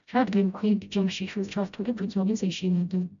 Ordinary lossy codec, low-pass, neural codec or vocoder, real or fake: MP3, 96 kbps; 7.2 kHz; codec, 16 kHz, 0.5 kbps, FreqCodec, smaller model; fake